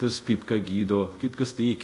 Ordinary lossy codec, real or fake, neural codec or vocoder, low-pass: AAC, 48 kbps; fake; codec, 24 kHz, 0.5 kbps, DualCodec; 10.8 kHz